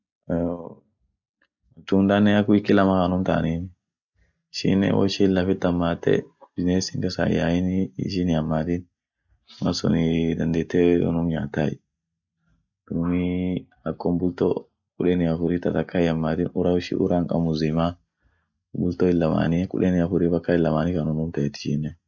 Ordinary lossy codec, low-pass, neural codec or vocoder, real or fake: none; 7.2 kHz; none; real